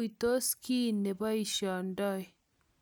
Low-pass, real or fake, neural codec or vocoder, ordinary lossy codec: none; real; none; none